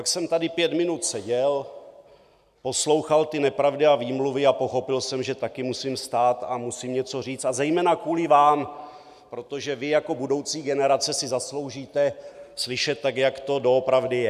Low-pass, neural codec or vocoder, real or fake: 14.4 kHz; none; real